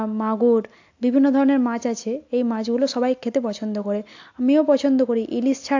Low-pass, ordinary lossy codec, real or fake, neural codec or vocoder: 7.2 kHz; AAC, 48 kbps; real; none